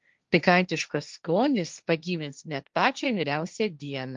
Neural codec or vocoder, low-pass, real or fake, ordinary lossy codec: codec, 16 kHz, 1.1 kbps, Voila-Tokenizer; 7.2 kHz; fake; Opus, 32 kbps